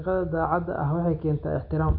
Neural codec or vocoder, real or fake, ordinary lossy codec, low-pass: none; real; none; 5.4 kHz